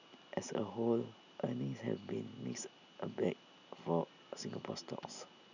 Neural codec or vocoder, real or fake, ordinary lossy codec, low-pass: none; real; none; 7.2 kHz